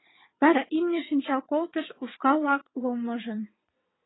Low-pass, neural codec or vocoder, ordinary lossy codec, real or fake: 7.2 kHz; vocoder, 22.05 kHz, 80 mel bands, WaveNeXt; AAC, 16 kbps; fake